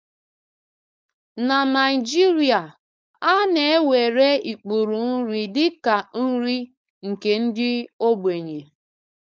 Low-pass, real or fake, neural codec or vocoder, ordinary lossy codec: none; fake; codec, 16 kHz, 4.8 kbps, FACodec; none